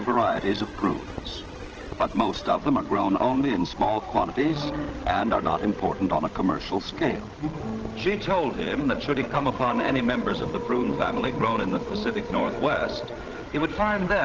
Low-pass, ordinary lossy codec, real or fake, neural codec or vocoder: 7.2 kHz; Opus, 16 kbps; fake; codec, 16 kHz, 16 kbps, FreqCodec, larger model